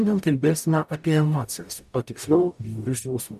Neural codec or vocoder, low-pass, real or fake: codec, 44.1 kHz, 0.9 kbps, DAC; 14.4 kHz; fake